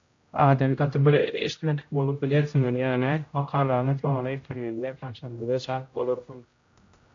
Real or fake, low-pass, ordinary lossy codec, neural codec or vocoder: fake; 7.2 kHz; AAC, 48 kbps; codec, 16 kHz, 0.5 kbps, X-Codec, HuBERT features, trained on general audio